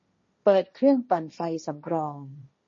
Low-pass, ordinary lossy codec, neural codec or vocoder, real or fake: 7.2 kHz; MP3, 32 kbps; codec, 16 kHz, 1.1 kbps, Voila-Tokenizer; fake